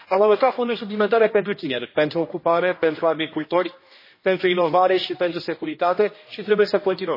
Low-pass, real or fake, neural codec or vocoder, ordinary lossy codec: 5.4 kHz; fake; codec, 16 kHz, 1 kbps, X-Codec, HuBERT features, trained on general audio; MP3, 24 kbps